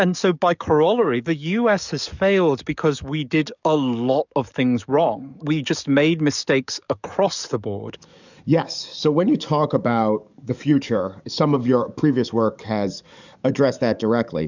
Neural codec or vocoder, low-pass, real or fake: codec, 44.1 kHz, 7.8 kbps, DAC; 7.2 kHz; fake